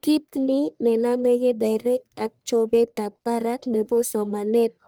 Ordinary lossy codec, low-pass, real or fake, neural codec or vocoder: none; none; fake; codec, 44.1 kHz, 1.7 kbps, Pupu-Codec